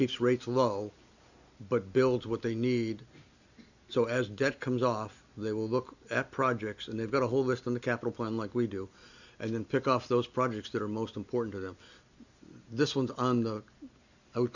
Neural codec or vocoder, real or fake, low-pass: none; real; 7.2 kHz